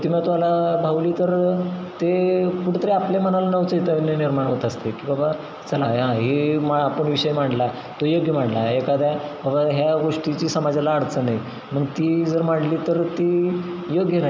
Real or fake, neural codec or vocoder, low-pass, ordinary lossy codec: real; none; none; none